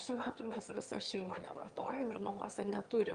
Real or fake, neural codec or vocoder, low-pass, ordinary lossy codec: fake; autoencoder, 22.05 kHz, a latent of 192 numbers a frame, VITS, trained on one speaker; 9.9 kHz; Opus, 24 kbps